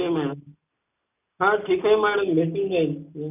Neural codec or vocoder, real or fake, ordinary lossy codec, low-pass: none; real; none; 3.6 kHz